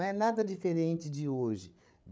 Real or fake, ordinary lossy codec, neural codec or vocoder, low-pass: fake; none; codec, 16 kHz, 8 kbps, FreqCodec, larger model; none